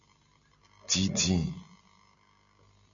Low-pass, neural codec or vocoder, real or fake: 7.2 kHz; none; real